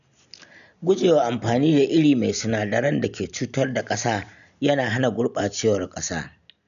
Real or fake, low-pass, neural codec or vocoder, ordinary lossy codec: real; 7.2 kHz; none; none